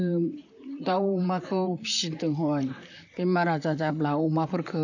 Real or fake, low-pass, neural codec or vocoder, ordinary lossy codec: fake; 7.2 kHz; vocoder, 44.1 kHz, 128 mel bands, Pupu-Vocoder; none